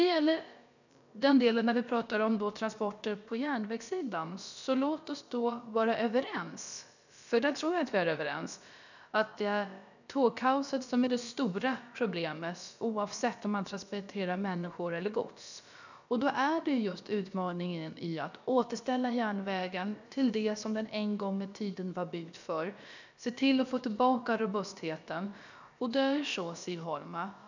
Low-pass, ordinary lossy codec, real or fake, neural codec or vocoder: 7.2 kHz; none; fake; codec, 16 kHz, about 1 kbps, DyCAST, with the encoder's durations